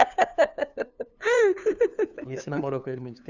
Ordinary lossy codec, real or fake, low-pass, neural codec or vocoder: none; fake; 7.2 kHz; codec, 16 kHz, 2 kbps, FunCodec, trained on LibriTTS, 25 frames a second